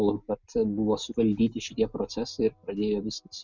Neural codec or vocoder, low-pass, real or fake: none; 7.2 kHz; real